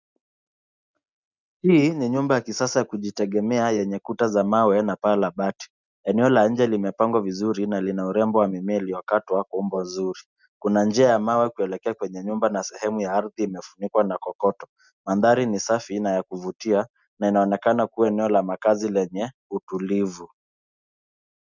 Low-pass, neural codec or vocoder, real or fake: 7.2 kHz; none; real